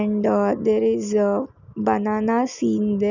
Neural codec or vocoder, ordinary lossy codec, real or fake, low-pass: none; none; real; 7.2 kHz